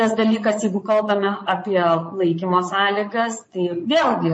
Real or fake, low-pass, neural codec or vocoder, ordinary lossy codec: fake; 9.9 kHz; vocoder, 22.05 kHz, 80 mel bands, Vocos; MP3, 32 kbps